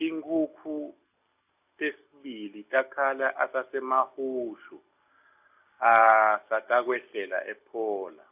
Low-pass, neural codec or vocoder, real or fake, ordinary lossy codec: 3.6 kHz; none; real; AAC, 32 kbps